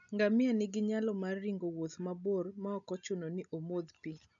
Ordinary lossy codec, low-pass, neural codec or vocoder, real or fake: none; 7.2 kHz; none; real